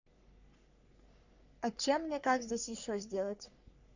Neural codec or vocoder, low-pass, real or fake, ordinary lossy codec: codec, 44.1 kHz, 3.4 kbps, Pupu-Codec; 7.2 kHz; fake; none